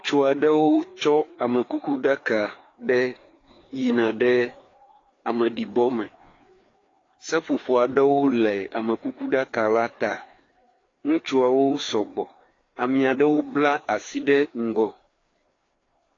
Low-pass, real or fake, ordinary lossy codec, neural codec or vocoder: 7.2 kHz; fake; AAC, 32 kbps; codec, 16 kHz, 2 kbps, FreqCodec, larger model